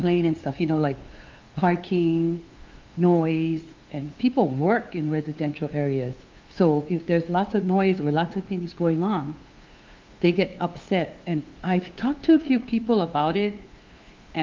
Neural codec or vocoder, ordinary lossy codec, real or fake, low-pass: codec, 16 kHz, 2 kbps, FunCodec, trained on LibriTTS, 25 frames a second; Opus, 24 kbps; fake; 7.2 kHz